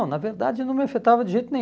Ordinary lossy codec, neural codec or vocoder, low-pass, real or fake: none; none; none; real